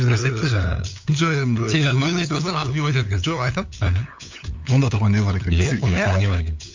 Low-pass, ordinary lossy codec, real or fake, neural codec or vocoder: 7.2 kHz; MP3, 48 kbps; fake; codec, 16 kHz, 8 kbps, FunCodec, trained on LibriTTS, 25 frames a second